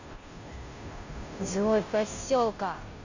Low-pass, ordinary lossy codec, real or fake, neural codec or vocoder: 7.2 kHz; none; fake; codec, 16 kHz, 0.5 kbps, FunCodec, trained on Chinese and English, 25 frames a second